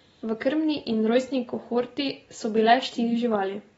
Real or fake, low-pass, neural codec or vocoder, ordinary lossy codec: real; 19.8 kHz; none; AAC, 24 kbps